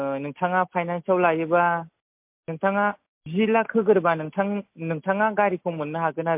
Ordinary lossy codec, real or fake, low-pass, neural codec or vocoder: MP3, 32 kbps; real; 3.6 kHz; none